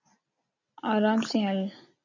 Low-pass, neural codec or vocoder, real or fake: 7.2 kHz; none; real